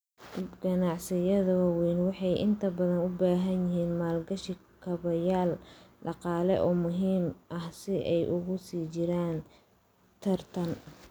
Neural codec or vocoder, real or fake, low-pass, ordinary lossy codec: none; real; none; none